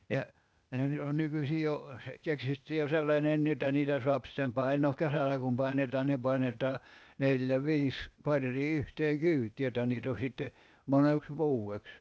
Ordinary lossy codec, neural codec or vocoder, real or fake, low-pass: none; codec, 16 kHz, 0.8 kbps, ZipCodec; fake; none